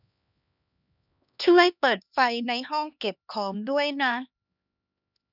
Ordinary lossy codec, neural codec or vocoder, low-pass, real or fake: none; codec, 16 kHz, 2 kbps, X-Codec, HuBERT features, trained on LibriSpeech; 5.4 kHz; fake